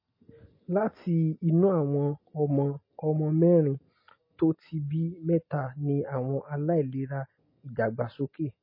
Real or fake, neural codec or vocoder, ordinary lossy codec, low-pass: real; none; MP3, 24 kbps; 5.4 kHz